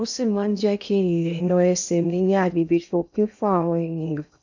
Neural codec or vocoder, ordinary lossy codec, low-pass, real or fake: codec, 16 kHz in and 24 kHz out, 0.6 kbps, FocalCodec, streaming, 2048 codes; none; 7.2 kHz; fake